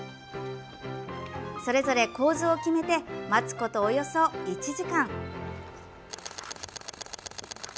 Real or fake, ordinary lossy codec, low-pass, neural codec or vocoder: real; none; none; none